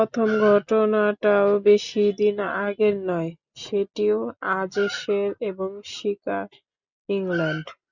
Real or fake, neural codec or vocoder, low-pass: real; none; 7.2 kHz